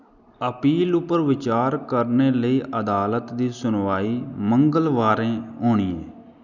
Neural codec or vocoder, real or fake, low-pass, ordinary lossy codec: none; real; 7.2 kHz; none